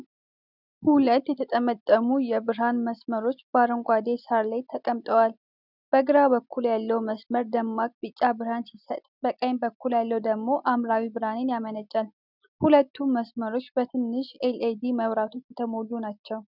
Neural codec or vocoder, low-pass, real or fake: none; 5.4 kHz; real